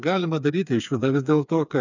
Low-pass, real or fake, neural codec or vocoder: 7.2 kHz; fake; codec, 16 kHz, 4 kbps, FreqCodec, smaller model